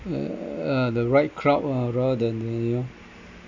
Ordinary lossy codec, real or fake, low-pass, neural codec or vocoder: MP3, 64 kbps; real; 7.2 kHz; none